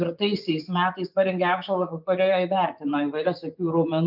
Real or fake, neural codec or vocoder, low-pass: fake; vocoder, 24 kHz, 100 mel bands, Vocos; 5.4 kHz